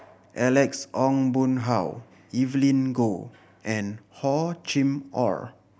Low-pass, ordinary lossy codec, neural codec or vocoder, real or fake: none; none; none; real